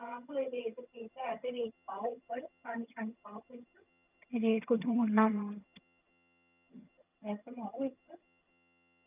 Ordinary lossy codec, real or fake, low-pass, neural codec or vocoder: none; fake; 3.6 kHz; vocoder, 22.05 kHz, 80 mel bands, HiFi-GAN